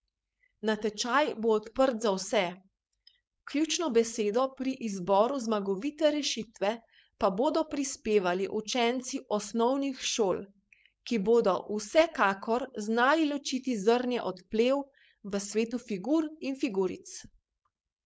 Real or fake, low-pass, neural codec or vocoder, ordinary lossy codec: fake; none; codec, 16 kHz, 4.8 kbps, FACodec; none